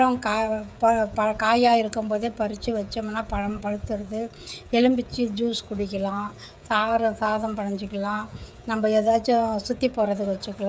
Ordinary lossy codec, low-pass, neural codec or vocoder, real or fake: none; none; codec, 16 kHz, 16 kbps, FreqCodec, smaller model; fake